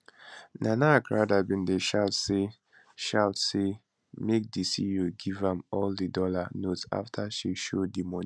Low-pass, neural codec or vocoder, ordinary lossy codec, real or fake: none; none; none; real